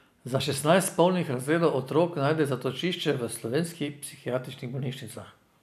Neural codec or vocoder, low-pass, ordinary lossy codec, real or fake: none; 14.4 kHz; none; real